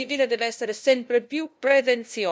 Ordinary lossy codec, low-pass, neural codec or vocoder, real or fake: none; none; codec, 16 kHz, 0.5 kbps, FunCodec, trained on LibriTTS, 25 frames a second; fake